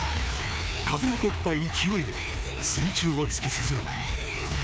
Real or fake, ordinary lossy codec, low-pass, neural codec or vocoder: fake; none; none; codec, 16 kHz, 2 kbps, FreqCodec, larger model